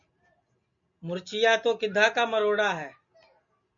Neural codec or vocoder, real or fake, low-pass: none; real; 7.2 kHz